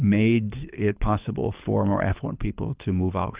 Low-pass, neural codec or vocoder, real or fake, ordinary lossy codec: 3.6 kHz; codec, 24 kHz, 6 kbps, HILCodec; fake; Opus, 32 kbps